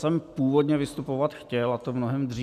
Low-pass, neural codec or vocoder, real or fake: 14.4 kHz; none; real